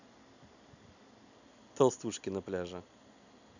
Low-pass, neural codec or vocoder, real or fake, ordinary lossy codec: 7.2 kHz; none; real; none